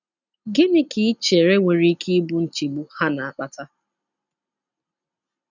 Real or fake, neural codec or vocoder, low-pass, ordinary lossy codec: real; none; 7.2 kHz; none